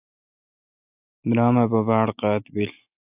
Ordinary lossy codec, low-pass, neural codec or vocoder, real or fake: AAC, 32 kbps; 3.6 kHz; none; real